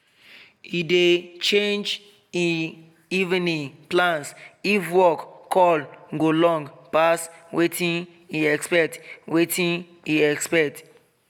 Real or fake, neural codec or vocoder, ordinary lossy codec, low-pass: real; none; none; 19.8 kHz